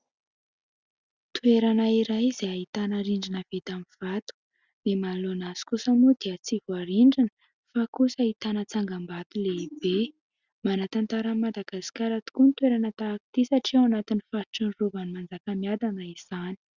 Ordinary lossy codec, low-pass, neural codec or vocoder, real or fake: Opus, 64 kbps; 7.2 kHz; none; real